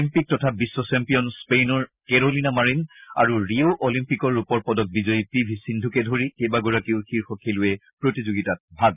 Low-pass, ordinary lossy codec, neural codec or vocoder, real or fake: 3.6 kHz; none; none; real